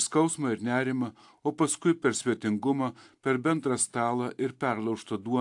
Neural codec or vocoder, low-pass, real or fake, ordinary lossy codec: none; 10.8 kHz; real; AAC, 64 kbps